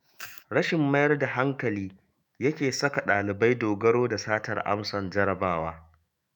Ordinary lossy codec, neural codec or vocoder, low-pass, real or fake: none; autoencoder, 48 kHz, 128 numbers a frame, DAC-VAE, trained on Japanese speech; 19.8 kHz; fake